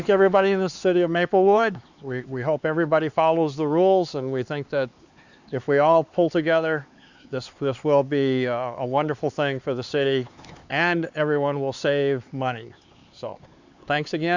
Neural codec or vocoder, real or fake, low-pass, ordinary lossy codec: codec, 16 kHz, 4 kbps, X-Codec, HuBERT features, trained on LibriSpeech; fake; 7.2 kHz; Opus, 64 kbps